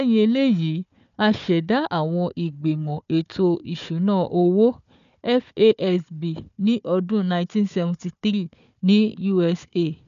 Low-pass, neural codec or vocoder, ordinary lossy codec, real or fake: 7.2 kHz; codec, 16 kHz, 4 kbps, FunCodec, trained on Chinese and English, 50 frames a second; none; fake